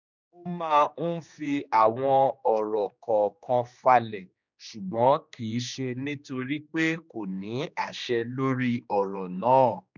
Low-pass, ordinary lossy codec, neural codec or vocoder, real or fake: 7.2 kHz; none; codec, 16 kHz, 2 kbps, X-Codec, HuBERT features, trained on general audio; fake